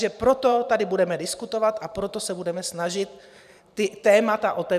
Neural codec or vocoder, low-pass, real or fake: vocoder, 44.1 kHz, 128 mel bands every 512 samples, BigVGAN v2; 14.4 kHz; fake